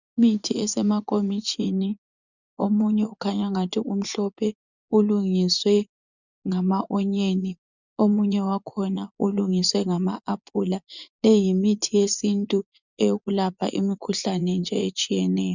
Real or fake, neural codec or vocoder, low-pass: fake; vocoder, 24 kHz, 100 mel bands, Vocos; 7.2 kHz